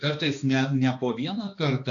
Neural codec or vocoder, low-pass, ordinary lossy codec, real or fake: codec, 16 kHz, 2 kbps, X-Codec, HuBERT features, trained on balanced general audio; 7.2 kHz; AAC, 64 kbps; fake